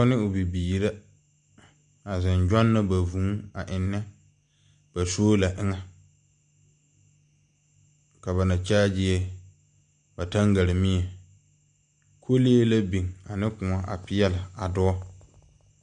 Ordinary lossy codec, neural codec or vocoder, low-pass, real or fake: MP3, 64 kbps; none; 9.9 kHz; real